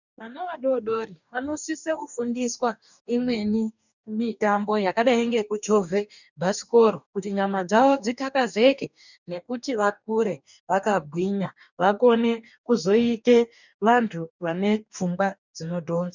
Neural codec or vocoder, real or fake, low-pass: codec, 44.1 kHz, 2.6 kbps, DAC; fake; 7.2 kHz